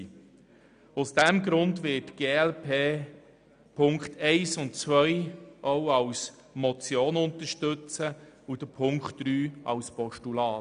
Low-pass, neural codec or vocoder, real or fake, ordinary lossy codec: 9.9 kHz; none; real; none